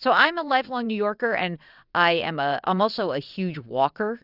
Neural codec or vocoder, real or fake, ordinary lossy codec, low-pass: vocoder, 22.05 kHz, 80 mel bands, WaveNeXt; fake; Opus, 64 kbps; 5.4 kHz